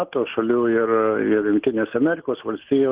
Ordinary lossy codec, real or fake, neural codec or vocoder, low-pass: Opus, 16 kbps; real; none; 3.6 kHz